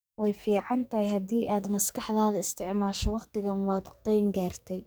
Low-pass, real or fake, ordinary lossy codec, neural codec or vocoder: none; fake; none; codec, 44.1 kHz, 2.6 kbps, SNAC